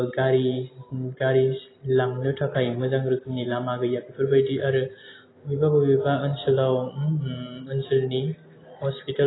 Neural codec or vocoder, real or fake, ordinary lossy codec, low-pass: none; real; AAC, 16 kbps; 7.2 kHz